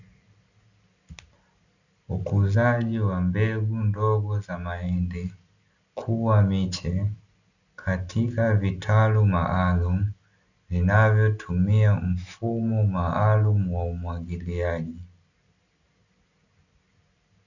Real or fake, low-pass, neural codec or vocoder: real; 7.2 kHz; none